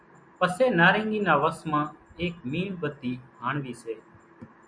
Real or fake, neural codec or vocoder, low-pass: real; none; 9.9 kHz